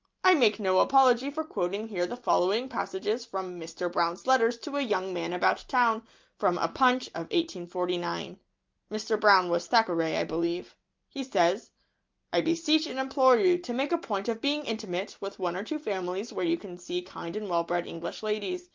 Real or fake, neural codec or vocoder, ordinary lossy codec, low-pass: real; none; Opus, 24 kbps; 7.2 kHz